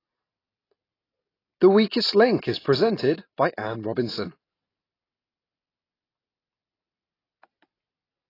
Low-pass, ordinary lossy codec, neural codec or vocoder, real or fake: 5.4 kHz; AAC, 24 kbps; none; real